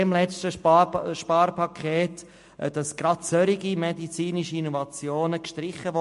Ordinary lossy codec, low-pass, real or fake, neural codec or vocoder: MP3, 48 kbps; 14.4 kHz; real; none